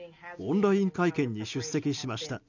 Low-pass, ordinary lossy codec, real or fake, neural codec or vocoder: 7.2 kHz; none; real; none